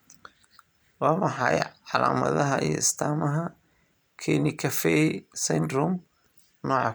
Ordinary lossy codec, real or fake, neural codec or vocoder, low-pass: none; real; none; none